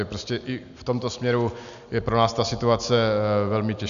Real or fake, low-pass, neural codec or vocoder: real; 7.2 kHz; none